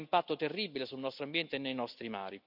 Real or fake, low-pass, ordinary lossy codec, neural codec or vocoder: real; 5.4 kHz; none; none